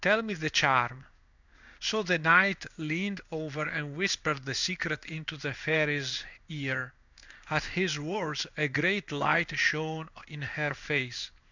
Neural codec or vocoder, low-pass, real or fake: codec, 16 kHz in and 24 kHz out, 1 kbps, XY-Tokenizer; 7.2 kHz; fake